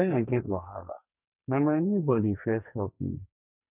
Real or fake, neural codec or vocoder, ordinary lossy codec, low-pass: fake; codec, 44.1 kHz, 2.6 kbps, SNAC; none; 3.6 kHz